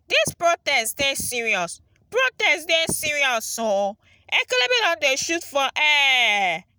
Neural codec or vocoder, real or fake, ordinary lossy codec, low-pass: none; real; none; none